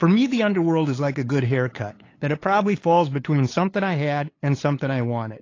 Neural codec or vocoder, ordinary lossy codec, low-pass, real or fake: codec, 16 kHz, 8 kbps, FunCodec, trained on LibriTTS, 25 frames a second; AAC, 32 kbps; 7.2 kHz; fake